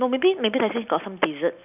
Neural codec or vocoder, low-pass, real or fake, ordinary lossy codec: none; 3.6 kHz; real; none